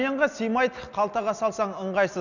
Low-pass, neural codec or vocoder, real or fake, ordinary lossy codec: 7.2 kHz; none; real; none